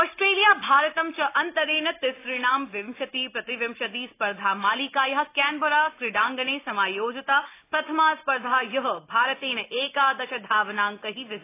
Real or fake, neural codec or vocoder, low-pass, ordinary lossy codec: real; none; 3.6 kHz; AAC, 24 kbps